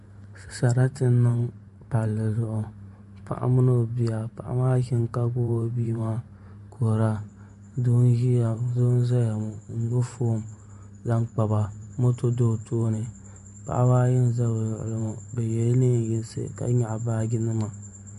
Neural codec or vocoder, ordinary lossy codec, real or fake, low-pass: vocoder, 44.1 kHz, 128 mel bands every 512 samples, BigVGAN v2; MP3, 48 kbps; fake; 14.4 kHz